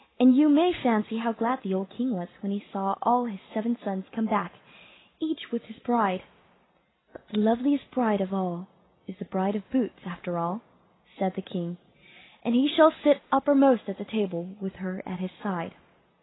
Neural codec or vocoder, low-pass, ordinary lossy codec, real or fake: none; 7.2 kHz; AAC, 16 kbps; real